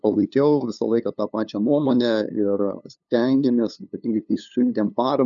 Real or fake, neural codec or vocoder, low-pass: fake; codec, 16 kHz, 2 kbps, FunCodec, trained on LibriTTS, 25 frames a second; 7.2 kHz